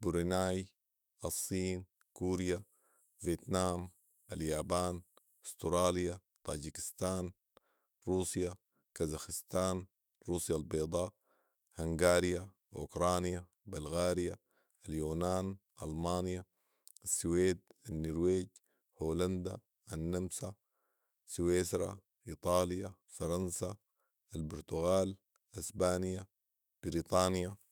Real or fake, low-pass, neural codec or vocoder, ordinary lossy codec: fake; none; autoencoder, 48 kHz, 128 numbers a frame, DAC-VAE, trained on Japanese speech; none